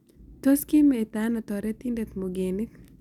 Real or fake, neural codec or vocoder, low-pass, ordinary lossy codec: real; none; 19.8 kHz; none